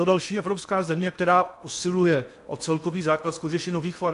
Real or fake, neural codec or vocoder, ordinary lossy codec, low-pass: fake; codec, 16 kHz in and 24 kHz out, 0.8 kbps, FocalCodec, streaming, 65536 codes; AAC, 64 kbps; 10.8 kHz